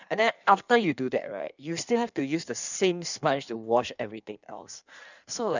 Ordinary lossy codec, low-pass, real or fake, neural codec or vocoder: none; 7.2 kHz; fake; codec, 16 kHz in and 24 kHz out, 1.1 kbps, FireRedTTS-2 codec